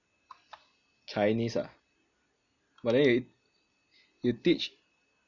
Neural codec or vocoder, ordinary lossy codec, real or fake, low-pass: none; none; real; 7.2 kHz